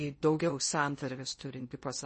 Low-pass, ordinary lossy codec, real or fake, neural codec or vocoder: 10.8 kHz; MP3, 32 kbps; fake; codec, 16 kHz in and 24 kHz out, 0.6 kbps, FocalCodec, streaming, 2048 codes